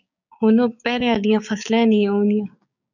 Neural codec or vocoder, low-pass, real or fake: codec, 16 kHz, 6 kbps, DAC; 7.2 kHz; fake